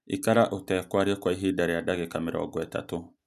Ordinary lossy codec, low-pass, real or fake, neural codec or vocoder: none; 14.4 kHz; real; none